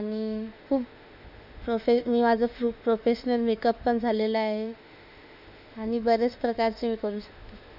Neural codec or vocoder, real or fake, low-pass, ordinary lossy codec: autoencoder, 48 kHz, 32 numbers a frame, DAC-VAE, trained on Japanese speech; fake; 5.4 kHz; none